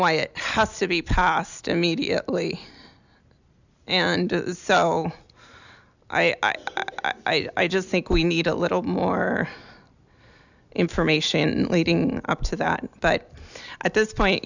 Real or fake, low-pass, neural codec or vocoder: real; 7.2 kHz; none